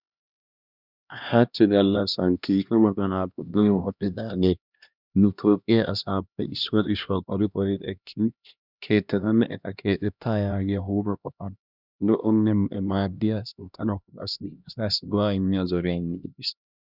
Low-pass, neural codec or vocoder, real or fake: 5.4 kHz; codec, 16 kHz, 1 kbps, X-Codec, HuBERT features, trained on LibriSpeech; fake